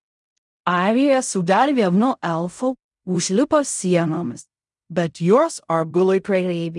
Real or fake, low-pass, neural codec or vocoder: fake; 10.8 kHz; codec, 16 kHz in and 24 kHz out, 0.4 kbps, LongCat-Audio-Codec, fine tuned four codebook decoder